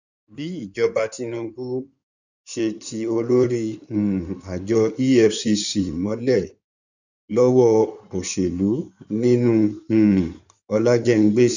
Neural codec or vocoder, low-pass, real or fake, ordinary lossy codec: codec, 16 kHz in and 24 kHz out, 2.2 kbps, FireRedTTS-2 codec; 7.2 kHz; fake; none